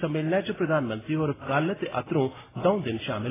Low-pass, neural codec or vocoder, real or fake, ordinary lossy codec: 3.6 kHz; none; real; AAC, 16 kbps